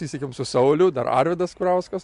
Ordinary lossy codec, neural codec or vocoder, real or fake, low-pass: MP3, 64 kbps; none; real; 10.8 kHz